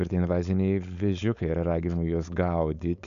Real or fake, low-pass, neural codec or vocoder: fake; 7.2 kHz; codec, 16 kHz, 4.8 kbps, FACodec